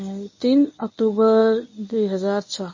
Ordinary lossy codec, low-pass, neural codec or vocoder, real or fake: MP3, 32 kbps; 7.2 kHz; codec, 24 kHz, 0.9 kbps, WavTokenizer, medium speech release version 1; fake